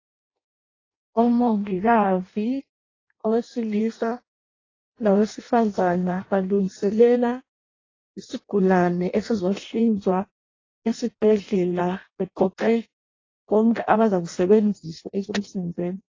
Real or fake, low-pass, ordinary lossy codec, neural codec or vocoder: fake; 7.2 kHz; AAC, 32 kbps; codec, 16 kHz in and 24 kHz out, 0.6 kbps, FireRedTTS-2 codec